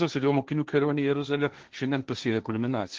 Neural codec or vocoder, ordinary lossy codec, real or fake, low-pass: codec, 16 kHz, 1.1 kbps, Voila-Tokenizer; Opus, 16 kbps; fake; 7.2 kHz